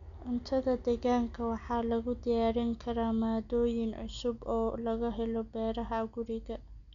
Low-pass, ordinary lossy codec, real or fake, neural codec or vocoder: 7.2 kHz; none; real; none